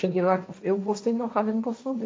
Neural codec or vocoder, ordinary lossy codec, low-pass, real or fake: codec, 16 kHz, 1.1 kbps, Voila-Tokenizer; none; none; fake